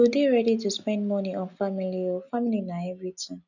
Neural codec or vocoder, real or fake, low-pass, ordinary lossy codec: none; real; 7.2 kHz; none